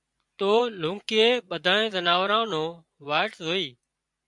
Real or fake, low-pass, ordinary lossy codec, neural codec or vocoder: fake; 10.8 kHz; MP3, 96 kbps; vocoder, 24 kHz, 100 mel bands, Vocos